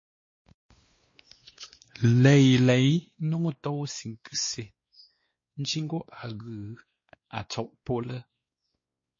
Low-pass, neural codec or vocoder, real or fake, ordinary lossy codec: 7.2 kHz; codec, 16 kHz, 2 kbps, X-Codec, WavLM features, trained on Multilingual LibriSpeech; fake; MP3, 32 kbps